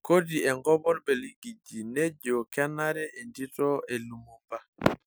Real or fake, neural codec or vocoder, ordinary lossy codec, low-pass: real; none; none; none